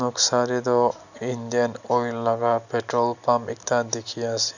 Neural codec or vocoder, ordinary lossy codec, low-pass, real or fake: none; none; 7.2 kHz; real